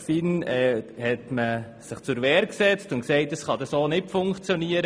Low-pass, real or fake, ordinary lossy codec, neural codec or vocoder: none; real; none; none